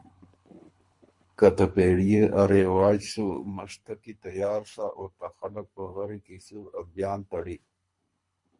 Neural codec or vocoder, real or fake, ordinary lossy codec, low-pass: codec, 24 kHz, 3 kbps, HILCodec; fake; MP3, 48 kbps; 10.8 kHz